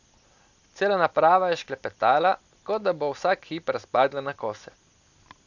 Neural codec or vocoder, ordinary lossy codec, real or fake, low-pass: none; none; real; 7.2 kHz